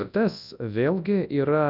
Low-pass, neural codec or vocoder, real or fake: 5.4 kHz; codec, 24 kHz, 0.9 kbps, WavTokenizer, large speech release; fake